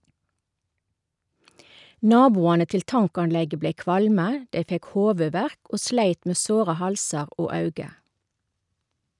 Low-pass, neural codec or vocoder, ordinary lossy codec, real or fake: 10.8 kHz; none; none; real